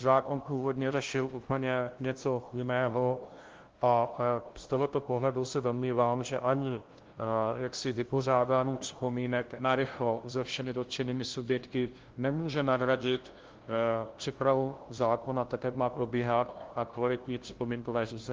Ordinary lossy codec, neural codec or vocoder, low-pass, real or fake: Opus, 16 kbps; codec, 16 kHz, 0.5 kbps, FunCodec, trained on LibriTTS, 25 frames a second; 7.2 kHz; fake